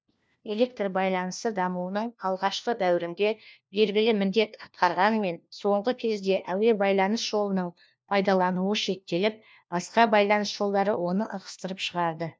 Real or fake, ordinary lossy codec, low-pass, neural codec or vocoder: fake; none; none; codec, 16 kHz, 1 kbps, FunCodec, trained on LibriTTS, 50 frames a second